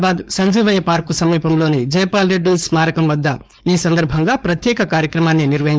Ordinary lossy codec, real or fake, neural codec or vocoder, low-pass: none; fake; codec, 16 kHz, 4.8 kbps, FACodec; none